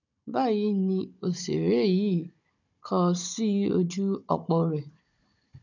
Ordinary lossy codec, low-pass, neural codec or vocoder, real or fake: none; 7.2 kHz; codec, 16 kHz, 16 kbps, FunCodec, trained on Chinese and English, 50 frames a second; fake